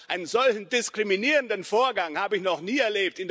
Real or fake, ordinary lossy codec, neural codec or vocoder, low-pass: real; none; none; none